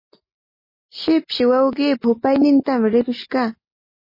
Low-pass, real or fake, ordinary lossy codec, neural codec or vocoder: 5.4 kHz; real; MP3, 24 kbps; none